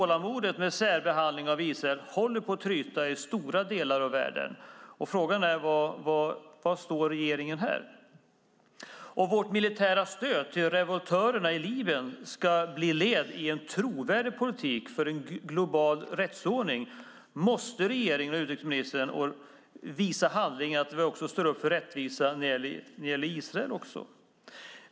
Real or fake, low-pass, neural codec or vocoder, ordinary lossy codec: real; none; none; none